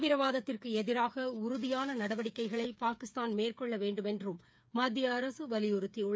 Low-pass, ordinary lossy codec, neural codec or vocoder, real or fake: none; none; codec, 16 kHz, 8 kbps, FreqCodec, smaller model; fake